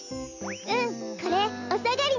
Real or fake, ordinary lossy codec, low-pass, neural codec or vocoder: real; none; 7.2 kHz; none